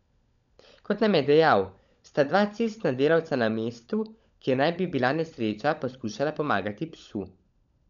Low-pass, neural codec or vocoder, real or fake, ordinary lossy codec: 7.2 kHz; codec, 16 kHz, 16 kbps, FunCodec, trained on LibriTTS, 50 frames a second; fake; none